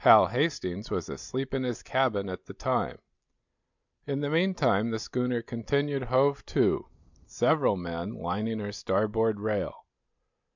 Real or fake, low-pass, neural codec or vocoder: real; 7.2 kHz; none